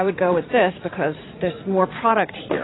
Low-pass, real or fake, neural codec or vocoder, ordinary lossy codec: 7.2 kHz; fake; vocoder, 44.1 kHz, 80 mel bands, Vocos; AAC, 16 kbps